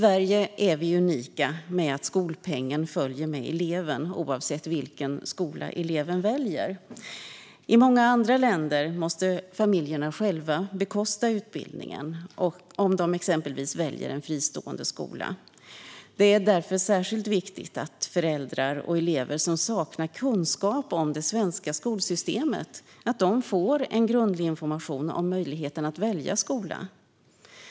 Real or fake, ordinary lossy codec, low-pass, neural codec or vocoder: real; none; none; none